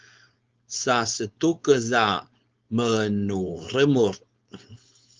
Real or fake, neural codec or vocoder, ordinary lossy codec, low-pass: fake; codec, 16 kHz, 4.8 kbps, FACodec; Opus, 24 kbps; 7.2 kHz